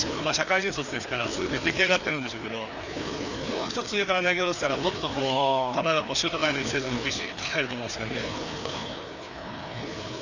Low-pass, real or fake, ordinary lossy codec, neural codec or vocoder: 7.2 kHz; fake; none; codec, 16 kHz, 2 kbps, FreqCodec, larger model